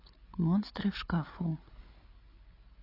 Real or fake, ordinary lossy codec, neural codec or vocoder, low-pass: fake; AAC, 24 kbps; codec, 16 kHz, 8 kbps, FreqCodec, larger model; 5.4 kHz